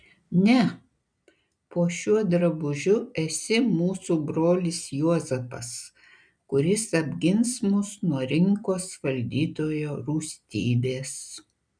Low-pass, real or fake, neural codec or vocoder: 9.9 kHz; real; none